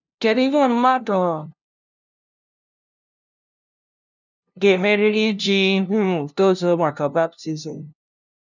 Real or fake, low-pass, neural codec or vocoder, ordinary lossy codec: fake; 7.2 kHz; codec, 16 kHz, 0.5 kbps, FunCodec, trained on LibriTTS, 25 frames a second; none